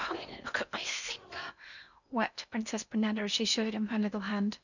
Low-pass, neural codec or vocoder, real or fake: 7.2 kHz; codec, 16 kHz in and 24 kHz out, 0.8 kbps, FocalCodec, streaming, 65536 codes; fake